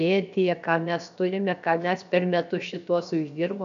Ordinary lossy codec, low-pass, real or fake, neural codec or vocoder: AAC, 96 kbps; 7.2 kHz; fake; codec, 16 kHz, about 1 kbps, DyCAST, with the encoder's durations